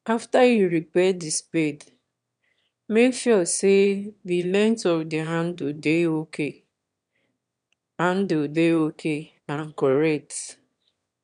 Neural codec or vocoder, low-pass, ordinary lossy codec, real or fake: autoencoder, 22.05 kHz, a latent of 192 numbers a frame, VITS, trained on one speaker; 9.9 kHz; none; fake